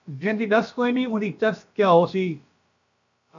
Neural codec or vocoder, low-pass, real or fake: codec, 16 kHz, about 1 kbps, DyCAST, with the encoder's durations; 7.2 kHz; fake